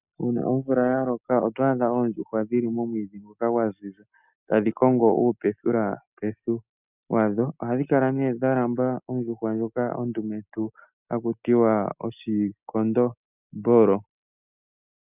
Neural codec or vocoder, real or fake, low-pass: none; real; 3.6 kHz